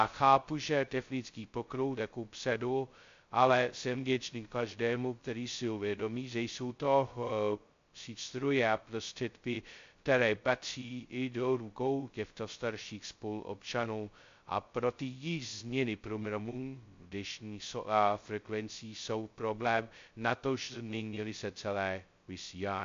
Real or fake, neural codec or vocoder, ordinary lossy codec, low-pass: fake; codec, 16 kHz, 0.2 kbps, FocalCodec; MP3, 48 kbps; 7.2 kHz